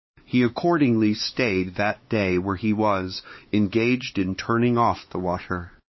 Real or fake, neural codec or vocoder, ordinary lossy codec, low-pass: fake; codec, 16 kHz, 4 kbps, X-Codec, HuBERT features, trained on LibriSpeech; MP3, 24 kbps; 7.2 kHz